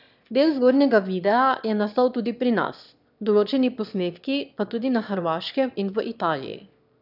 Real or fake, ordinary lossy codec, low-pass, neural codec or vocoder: fake; none; 5.4 kHz; autoencoder, 22.05 kHz, a latent of 192 numbers a frame, VITS, trained on one speaker